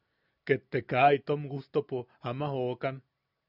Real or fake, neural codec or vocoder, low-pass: real; none; 5.4 kHz